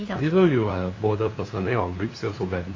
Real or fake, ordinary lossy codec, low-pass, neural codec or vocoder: fake; AAC, 32 kbps; 7.2 kHz; codec, 16 kHz, 2 kbps, FunCodec, trained on LibriTTS, 25 frames a second